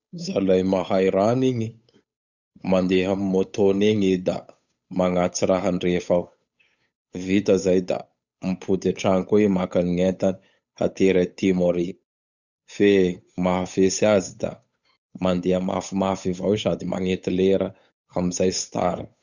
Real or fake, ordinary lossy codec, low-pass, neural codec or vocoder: fake; none; 7.2 kHz; codec, 16 kHz, 8 kbps, FunCodec, trained on Chinese and English, 25 frames a second